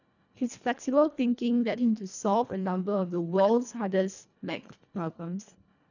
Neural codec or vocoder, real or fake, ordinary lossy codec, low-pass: codec, 24 kHz, 1.5 kbps, HILCodec; fake; none; 7.2 kHz